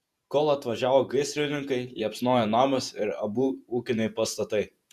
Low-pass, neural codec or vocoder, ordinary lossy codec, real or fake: 14.4 kHz; vocoder, 48 kHz, 128 mel bands, Vocos; Opus, 64 kbps; fake